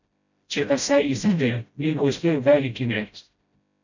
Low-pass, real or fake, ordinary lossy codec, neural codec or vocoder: 7.2 kHz; fake; none; codec, 16 kHz, 0.5 kbps, FreqCodec, smaller model